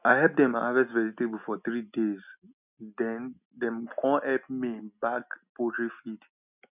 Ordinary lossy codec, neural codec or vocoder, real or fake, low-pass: none; none; real; 3.6 kHz